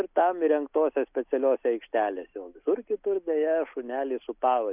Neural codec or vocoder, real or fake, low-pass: none; real; 3.6 kHz